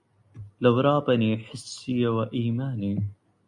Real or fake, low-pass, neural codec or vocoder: real; 10.8 kHz; none